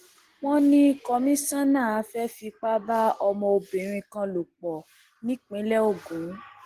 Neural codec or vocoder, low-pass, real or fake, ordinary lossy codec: none; 14.4 kHz; real; Opus, 16 kbps